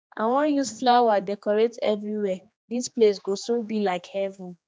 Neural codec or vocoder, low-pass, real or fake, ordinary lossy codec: codec, 16 kHz, 2 kbps, X-Codec, HuBERT features, trained on general audio; none; fake; none